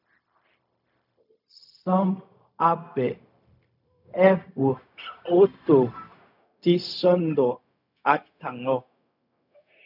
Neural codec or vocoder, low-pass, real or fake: codec, 16 kHz, 0.4 kbps, LongCat-Audio-Codec; 5.4 kHz; fake